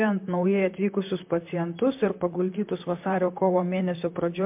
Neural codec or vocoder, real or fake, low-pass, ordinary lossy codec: vocoder, 44.1 kHz, 128 mel bands, Pupu-Vocoder; fake; 3.6 kHz; MP3, 32 kbps